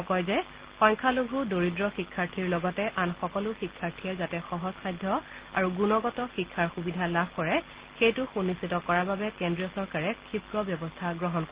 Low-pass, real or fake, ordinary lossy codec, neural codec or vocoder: 3.6 kHz; real; Opus, 16 kbps; none